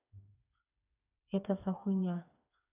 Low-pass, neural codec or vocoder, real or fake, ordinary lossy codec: 3.6 kHz; codec, 16 kHz, 4 kbps, FreqCodec, smaller model; fake; none